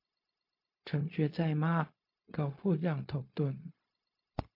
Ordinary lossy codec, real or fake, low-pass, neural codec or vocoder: MP3, 32 kbps; fake; 5.4 kHz; codec, 16 kHz, 0.4 kbps, LongCat-Audio-Codec